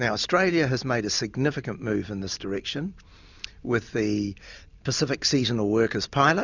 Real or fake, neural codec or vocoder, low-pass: real; none; 7.2 kHz